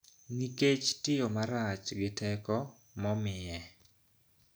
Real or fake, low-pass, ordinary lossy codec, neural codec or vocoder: real; none; none; none